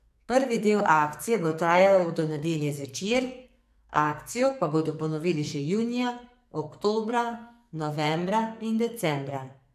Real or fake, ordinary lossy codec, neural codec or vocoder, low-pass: fake; AAC, 96 kbps; codec, 44.1 kHz, 2.6 kbps, SNAC; 14.4 kHz